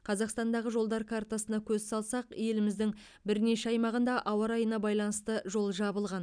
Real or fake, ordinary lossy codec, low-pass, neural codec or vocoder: real; none; 9.9 kHz; none